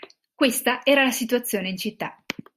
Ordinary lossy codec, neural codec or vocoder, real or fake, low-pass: Opus, 64 kbps; none; real; 14.4 kHz